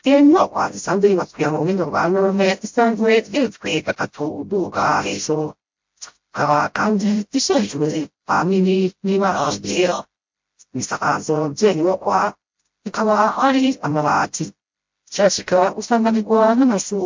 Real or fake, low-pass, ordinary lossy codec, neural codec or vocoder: fake; 7.2 kHz; MP3, 48 kbps; codec, 16 kHz, 0.5 kbps, FreqCodec, smaller model